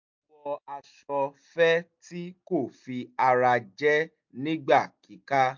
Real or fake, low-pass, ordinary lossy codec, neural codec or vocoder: real; 7.2 kHz; MP3, 48 kbps; none